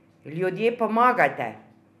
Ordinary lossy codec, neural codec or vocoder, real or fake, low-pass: none; none; real; 14.4 kHz